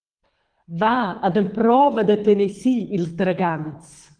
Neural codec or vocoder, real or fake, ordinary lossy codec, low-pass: codec, 24 kHz, 3 kbps, HILCodec; fake; Opus, 32 kbps; 9.9 kHz